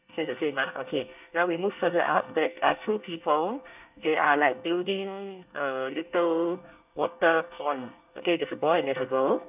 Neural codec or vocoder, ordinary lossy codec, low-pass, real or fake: codec, 24 kHz, 1 kbps, SNAC; none; 3.6 kHz; fake